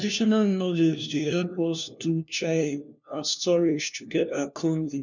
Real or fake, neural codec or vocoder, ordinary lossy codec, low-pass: fake; codec, 16 kHz, 1 kbps, FunCodec, trained on LibriTTS, 50 frames a second; none; 7.2 kHz